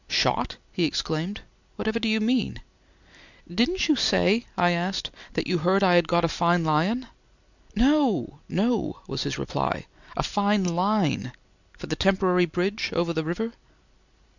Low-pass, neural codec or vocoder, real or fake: 7.2 kHz; none; real